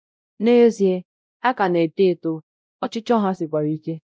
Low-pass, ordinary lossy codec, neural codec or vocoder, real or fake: none; none; codec, 16 kHz, 0.5 kbps, X-Codec, WavLM features, trained on Multilingual LibriSpeech; fake